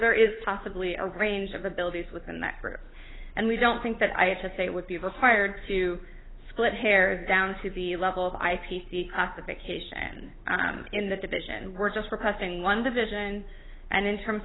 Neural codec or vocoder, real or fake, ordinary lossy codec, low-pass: codec, 16 kHz, 8 kbps, FunCodec, trained on Chinese and English, 25 frames a second; fake; AAC, 16 kbps; 7.2 kHz